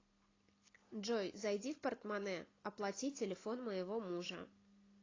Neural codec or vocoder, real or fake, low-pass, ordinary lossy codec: none; real; 7.2 kHz; AAC, 32 kbps